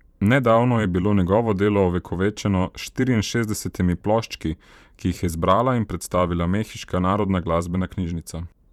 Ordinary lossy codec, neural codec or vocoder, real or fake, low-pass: none; vocoder, 44.1 kHz, 128 mel bands every 512 samples, BigVGAN v2; fake; 19.8 kHz